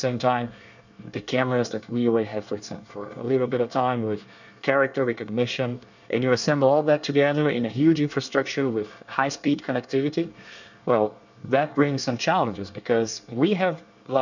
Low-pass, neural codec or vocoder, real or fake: 7.2 kHz; codec, 24 kHz, 1 kbps, SNAC; fake